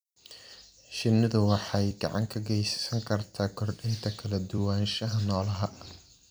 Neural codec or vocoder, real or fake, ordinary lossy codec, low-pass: vocoder, 44.1 kHz, 128 mel bands every 256 samples, BigVGAN v2; fake; none; none